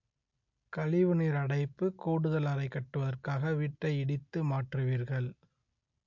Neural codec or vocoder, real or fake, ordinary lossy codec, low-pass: none; real; MP3, 48 kbps; 7.2 kHz